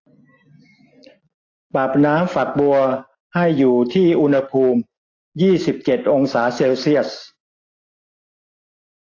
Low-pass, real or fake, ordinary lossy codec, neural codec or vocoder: 7.2 kHz; real; AAC, 32 kbps; none